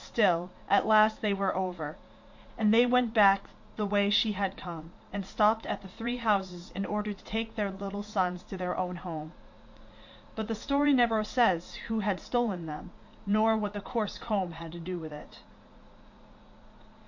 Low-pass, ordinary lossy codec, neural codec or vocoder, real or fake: 7.2 kHz; MP3, 48 kbps; vocoder, 44.1 kHz, 80 mel bands, Vocos; fake